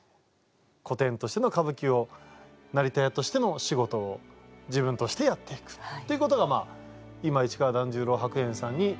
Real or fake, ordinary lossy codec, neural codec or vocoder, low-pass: real; none; none; none